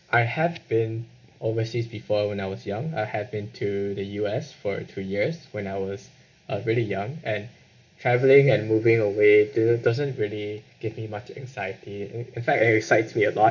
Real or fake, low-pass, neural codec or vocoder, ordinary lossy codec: real; 7.2 kHz; none; none